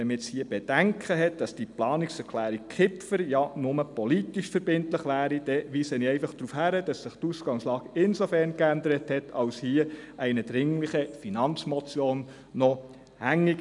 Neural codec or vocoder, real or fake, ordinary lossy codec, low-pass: none; real; none; 10.8 kHz